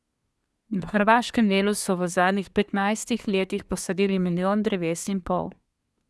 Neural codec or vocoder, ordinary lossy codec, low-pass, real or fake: codec, 24 kHz, 1 kbps, SNAC; none; none; fake